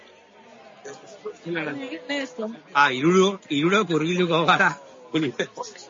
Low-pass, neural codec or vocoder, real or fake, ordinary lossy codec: 7.2 kHz; none; real; MP3, 32 kbps